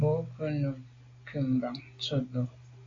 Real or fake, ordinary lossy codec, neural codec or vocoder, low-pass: real; AAC, 32 kbps; none; 7.2 kHz